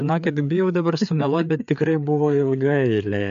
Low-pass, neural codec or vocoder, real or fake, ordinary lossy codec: 7.2 kHz; codec, 16 kHz, 4 kbps, FreqCodec, larger model; fake; MP3, 64 kbps